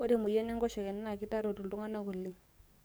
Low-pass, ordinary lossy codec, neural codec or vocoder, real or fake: none; none; codec, 44.1 kHz, 7.8 kbps, DAC; fake